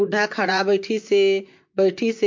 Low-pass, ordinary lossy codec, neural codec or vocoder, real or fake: 7.2 kHz; MP3, 48 kbps; vocoder, 44.1 kHz, 128 mel bands, Pupu-Vocoder; fake